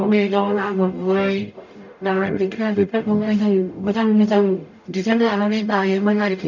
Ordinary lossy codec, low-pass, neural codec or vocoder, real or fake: none; 7.2 kHz; codec, 44.1 kHz, 0.9 kbps, DAC; fake